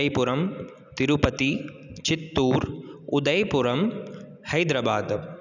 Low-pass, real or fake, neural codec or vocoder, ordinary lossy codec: 7.2 kHz; real; none; none